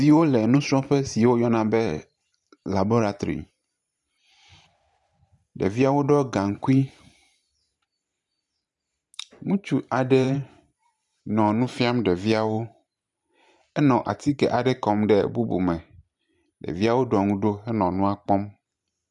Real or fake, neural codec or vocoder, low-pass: fake; vocoder, 44.1 kHz, 128 mel bands every 512 samples, BigVGAN v2; 10.8 kHz